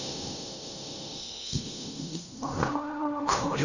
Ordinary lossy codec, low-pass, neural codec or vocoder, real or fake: none; 7.2 kHz; codec, 16 kHz in and 24 kHz out, 0.4 kbps, LongCat-Audio-Codec, fine tuned four codebook decoder; fake